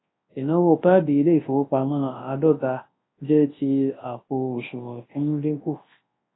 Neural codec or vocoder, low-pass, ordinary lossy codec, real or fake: codec, 24 kHz, 0.9 kbps, WavTokenizer, large speech release; 7.2 kHz; AAC, 16 kbps; fake